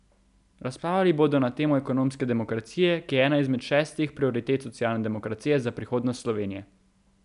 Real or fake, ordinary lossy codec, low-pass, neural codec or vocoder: real; none; 10.8 kHz; none